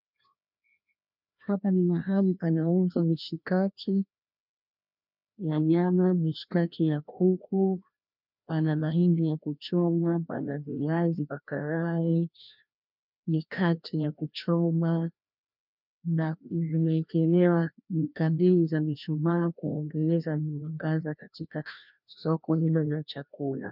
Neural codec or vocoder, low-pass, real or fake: codec, 16 kHz, 1 kbps, FreqCodec, larger model; 5.4 kHz; fake